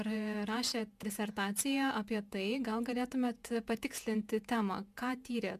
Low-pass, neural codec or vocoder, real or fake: 14.4 kHz; vocoder, 48 kHz, 128 mel bands, Vocos; fake